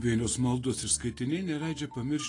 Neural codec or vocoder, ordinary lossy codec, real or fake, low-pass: none; AAC, 32 kbps; real; 10.8 kHz